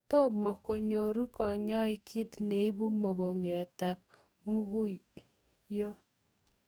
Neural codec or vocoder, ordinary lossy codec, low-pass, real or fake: codec, 44.1 kHz, 2.6 kbps, DAC; none; none; fake